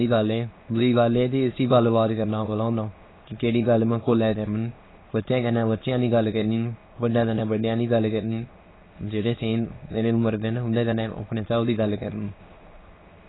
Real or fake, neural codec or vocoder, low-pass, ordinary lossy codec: fake; autoencoder, 22.05 kHz, a latent of 192 numbers a frame, VITS, trained on many speakers; 7.2 kHz; AAC, 16 kbps